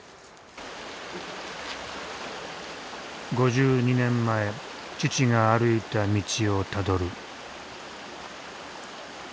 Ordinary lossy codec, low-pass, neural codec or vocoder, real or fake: none; none; none; real